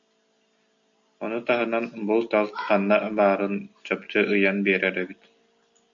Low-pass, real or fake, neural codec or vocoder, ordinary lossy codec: 7.2 kHz; real; none; MP3, 64 kbps